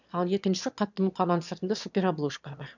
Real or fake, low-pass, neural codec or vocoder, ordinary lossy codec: fake; 7.2 kHz; autoencoder, 22.05 kHz, a latent of 192 numbers a frame, VITS, trained on one speaker; none